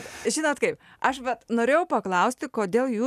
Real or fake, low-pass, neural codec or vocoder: real; 14.4 kHz; none